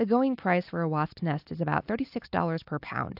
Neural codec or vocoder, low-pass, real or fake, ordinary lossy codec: none; 5.4 kHz; real; AAC, 48 kbps